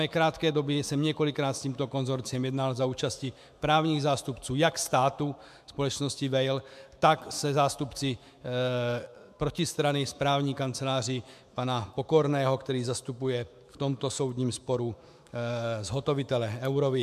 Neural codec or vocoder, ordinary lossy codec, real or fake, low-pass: autoencoder, 48 kHz, 128 numbers a frame, DAC-VAE, trained on Japanese speech; MP3, 96 kbps; fake; 14.4 kHz